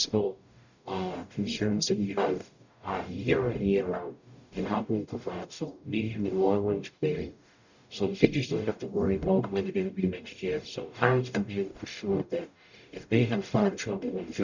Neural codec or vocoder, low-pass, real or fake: codec, 44.1 kHz, 0.9 kbps, DAC; 7.2 kHz; fake